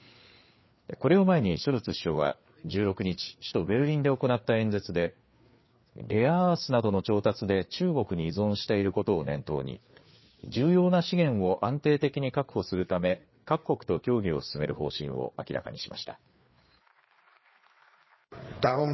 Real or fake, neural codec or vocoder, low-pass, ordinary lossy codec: fake; codec, 16 kHz, 4 kbps, FreqCodec, larger model; 7.2 kHz; MP3, 24 kbps